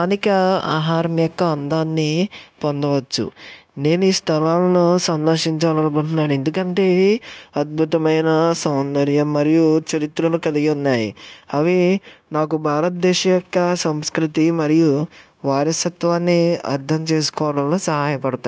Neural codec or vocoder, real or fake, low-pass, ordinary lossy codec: codec, 16 kHz, 0.9 kbps, LongCat-Audio-Codec; fake; none; none